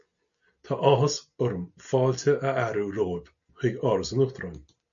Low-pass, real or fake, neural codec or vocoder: 7.2 kHz; real; none